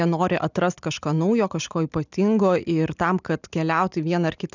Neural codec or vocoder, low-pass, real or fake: none; 7.2 kHz; real